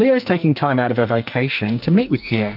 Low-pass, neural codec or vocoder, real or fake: 5.4 kHz; codec, 44.1 kHz, 2.6 kbps, SNAC; fake